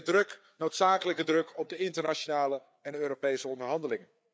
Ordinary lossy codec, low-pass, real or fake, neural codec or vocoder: none; none; fake; codec, 16 kHz, 4 kbps, FreqCodec, larger model